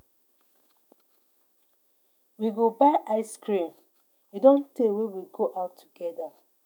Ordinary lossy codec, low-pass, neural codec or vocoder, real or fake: none; none; autoencoder, 48 kHz, 128 numbers a frame, DAC-VAE, trained on Japanese speech; fake